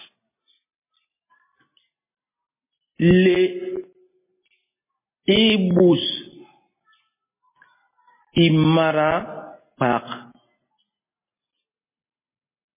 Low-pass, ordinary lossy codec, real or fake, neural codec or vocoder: 3.6 kHz; MP3, 16 kbps; real; none